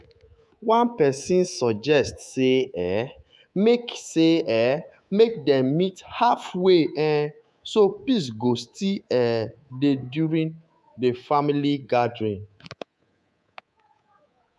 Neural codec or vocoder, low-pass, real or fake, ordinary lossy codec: codec, 24 kHz, 3.1 kbps, DualCodec; none; fake; none